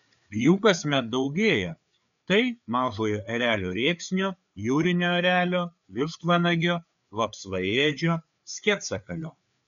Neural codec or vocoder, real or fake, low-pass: codec, 16 kHz, 4 kbps, FreqCodec, larger model; fake; 7.2 kHz